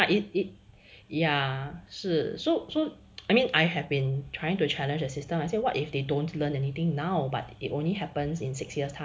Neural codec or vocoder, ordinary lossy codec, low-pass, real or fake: none; none; none; real